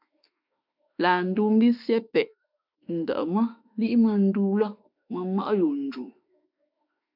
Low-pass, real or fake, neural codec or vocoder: 5.4 kHz; fake; autoencoder, 48 kHz, 32 numbers a frame, DAC-VAE, trained on Japanese speech